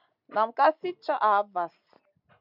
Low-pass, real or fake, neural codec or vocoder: 5.4 kHz; real; none